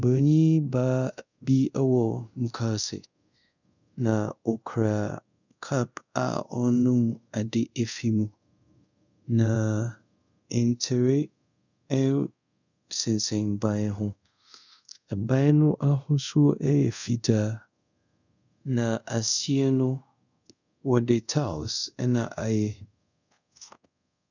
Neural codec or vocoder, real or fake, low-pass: codec, 24 kHz, 0.5 kbps, DualCodec; fake; 7.2 kHz